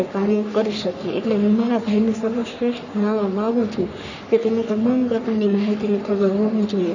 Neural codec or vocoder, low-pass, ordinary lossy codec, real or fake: codec, 44.1 kHz, 3.4 kbps, Pupu-Codec; 7.2 kHz; none; fake